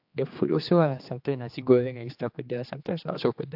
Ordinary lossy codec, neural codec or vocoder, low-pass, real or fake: AAC, 48 kbps; codec, 16 kHz, 2 kbps, X-Codec, HuBERT features, trained on general audio; 5.4 kHz; fake